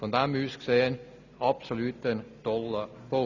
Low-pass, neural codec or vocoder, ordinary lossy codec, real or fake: 7.2 kHz; none; none; real